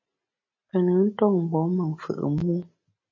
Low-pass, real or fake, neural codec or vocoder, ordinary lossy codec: 7.2 kHz; real; none; MP3, 32 kbps